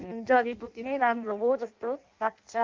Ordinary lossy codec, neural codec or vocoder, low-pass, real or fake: Opus, 24 kbps; codec, 16 kHz in and 24 kHz out, 0.6 kbps, FireRedTTS-2 codec; 7.2 kHz; fake